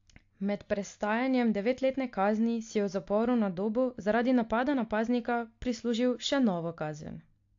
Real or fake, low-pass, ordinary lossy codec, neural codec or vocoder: real; 7.2 kHz; AAC, 48 kbps; none